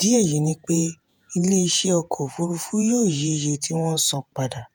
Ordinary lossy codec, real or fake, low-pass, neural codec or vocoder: none; fake; none; vocoder, 48 kHz, 128 mel bands, Vocos